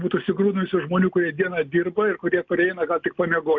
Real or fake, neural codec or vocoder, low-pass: real; none; 7.2 kHz